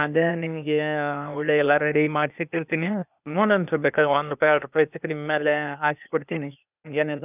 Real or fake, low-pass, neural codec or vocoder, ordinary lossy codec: fake; 3.6 kHz; codec, 16 kHz, 0.8 kbps, ZipCodec; none